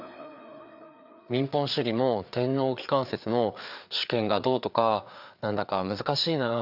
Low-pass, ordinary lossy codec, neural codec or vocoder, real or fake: 5.4 kHz; none; codec, 16 kHz, 4 kbps, FreqCodec, larger model; fake